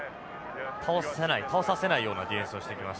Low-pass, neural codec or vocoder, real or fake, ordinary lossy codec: none; none; real; none